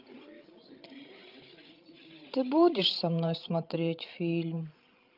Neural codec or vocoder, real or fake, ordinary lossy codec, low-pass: codec, 16 kHz, 16 kbps, FreqCodec, larger model; fake; Opus, 24 kbps; 5.4 kHz